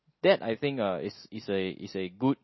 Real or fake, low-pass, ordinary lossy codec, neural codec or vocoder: real; 7.2 kHz; MP3, 24 kbps; none